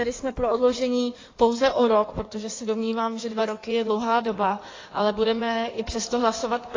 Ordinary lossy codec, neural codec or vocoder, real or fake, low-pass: AAC, 32 kbps; codec, 16 kHz in and 24 kHz out, 1.1 kbps, FireRedTTS-2 codec; fake; 7.2 kHz